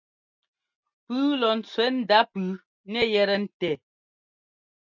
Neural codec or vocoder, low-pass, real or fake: none; 7.2 kHz; real